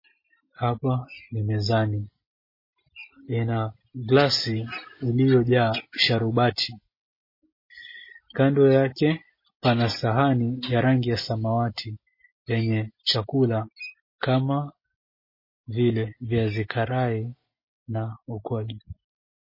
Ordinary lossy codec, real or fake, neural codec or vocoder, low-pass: MP3, 24 kbps; real; none; 5.4 kHz